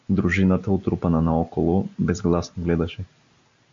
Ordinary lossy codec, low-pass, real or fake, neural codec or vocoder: MP3, 48 kbps; 7.2 kHz; real; none